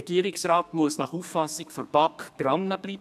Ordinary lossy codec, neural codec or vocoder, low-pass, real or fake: none; codec, 32 kHz, 1.9 kbps, SNAC; 14.4 kHz; fake